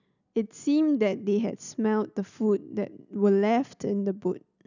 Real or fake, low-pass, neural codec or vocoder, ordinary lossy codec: real; 7.2 kHz; none; none